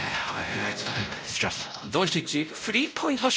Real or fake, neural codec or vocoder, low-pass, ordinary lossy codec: fake; codec, 16 kHz, 0.5 kbps, X-Codec, WavLM features, trained on Multilingual LibriSpeech; none; none